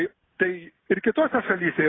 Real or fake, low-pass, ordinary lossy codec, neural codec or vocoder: real; 7.2 kHz; AAC, 16 kbps; none